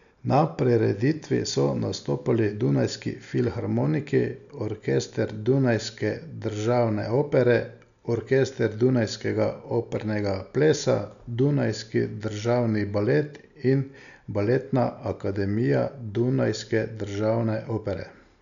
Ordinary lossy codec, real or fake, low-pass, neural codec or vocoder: none; real; 7.2 kHz; none